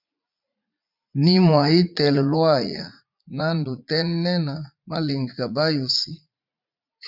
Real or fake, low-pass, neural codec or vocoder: fake; 5.4 kHz; vocoder, 44.1 kHz, 80 mel bands, Vocos